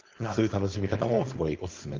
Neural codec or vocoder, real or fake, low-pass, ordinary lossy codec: codec, 16 kHz, 4.8 kbps, FACodec; fake; 7.2 kHz; Opus, 32 kbps